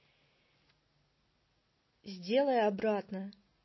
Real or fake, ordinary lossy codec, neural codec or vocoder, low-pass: real; MP3, 24 kbps; none; 7.2 kHz